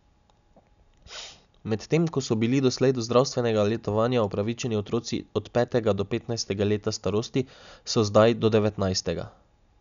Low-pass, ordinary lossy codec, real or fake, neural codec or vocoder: 7.2 kHz; MP3, 96 kbps; real; none